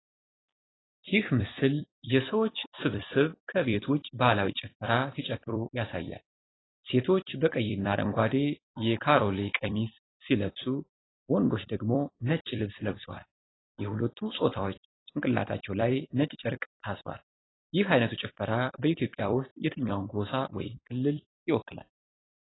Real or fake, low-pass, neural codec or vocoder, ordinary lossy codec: fake; 7.2 kHz; vocoder, 44.1 kHz, 128 mel bands every 256 samples, BigVGAN v2; AAC, 16 kbps